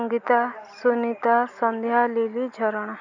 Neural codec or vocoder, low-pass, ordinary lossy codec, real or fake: none; 7.2 kHz; none; real